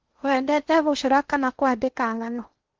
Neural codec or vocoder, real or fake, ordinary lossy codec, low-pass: codec, 16 kHz in and 24 kHz out, 0.6 kbps, FocalCodec, streaming, 2048 codes; fake; Opus, 16 kbps; 7.2 kHz